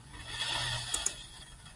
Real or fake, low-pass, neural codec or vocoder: fake; 10.8 kHz; vocoder, 24 kHz, 100 mel bands, Vocos